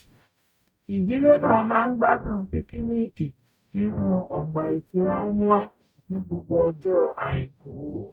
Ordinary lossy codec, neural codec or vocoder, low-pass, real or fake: none; codec, 44.1 kHz, 0.9 kbps, DAC; 19.8 kHz; fake